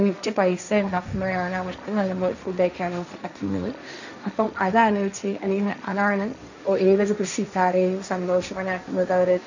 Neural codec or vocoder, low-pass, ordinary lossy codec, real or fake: codec, 16 kHz, 1.1 kbps, Voila-Tokenizer; 7.2 kHz; none; fake